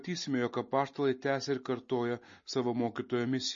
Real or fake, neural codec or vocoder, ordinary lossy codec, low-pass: real; none; MP3, 32 kbps; 7.2 kHz